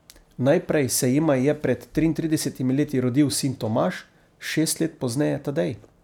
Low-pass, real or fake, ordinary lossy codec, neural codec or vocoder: 19.8 kHz; real; none; none